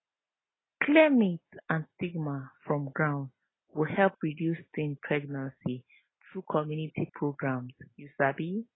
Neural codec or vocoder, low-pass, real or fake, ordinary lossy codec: none; 7.2 kHz; real; AAC, 16 kbps